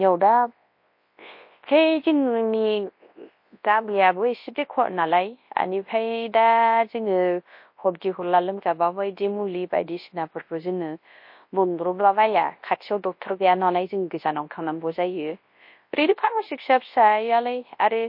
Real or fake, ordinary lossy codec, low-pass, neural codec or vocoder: fake; MP3, 32 kbps; 5.4 kHz; codec, 24 kHz, 0.9 kbps, WavTokenizer, large speech release